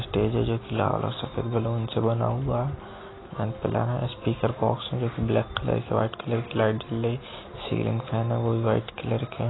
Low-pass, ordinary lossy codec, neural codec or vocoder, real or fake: 7.2 kHz; AAC, 16 kbps; none; real